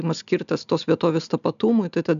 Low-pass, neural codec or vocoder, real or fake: 7.2 kHz; none; real